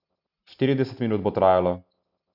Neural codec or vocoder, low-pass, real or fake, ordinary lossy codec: none; 5.4 kHz; real; none